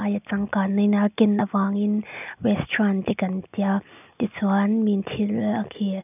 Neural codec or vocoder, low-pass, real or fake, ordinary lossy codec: none; 3.6 kHz; real; none